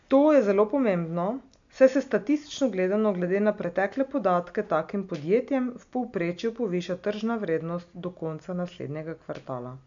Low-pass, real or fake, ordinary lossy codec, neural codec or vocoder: 7.2 kHz; real; MP3, 64 kbps; none